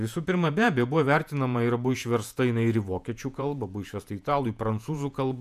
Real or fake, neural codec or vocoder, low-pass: fake; vocoder, 48 kHz, 128 mel bands, Vocos; 14.4 kHz